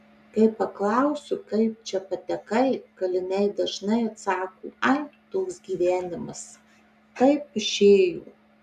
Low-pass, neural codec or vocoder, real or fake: 14.4 kHz; none; real